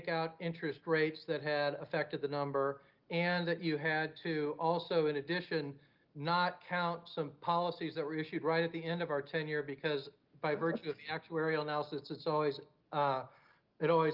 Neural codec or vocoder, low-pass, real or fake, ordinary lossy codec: none; 5.4 kHz; real; Opus, 32 kbps